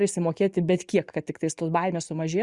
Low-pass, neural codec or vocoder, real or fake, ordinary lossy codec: 10.8 kHz; none; real; Opus, 64 kbps